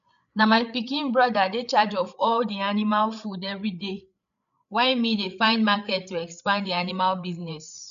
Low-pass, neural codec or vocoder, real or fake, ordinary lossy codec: 7.2 kHz; codec, 16 kHz, 8 kbps, FreqCodec, larger model; fake; none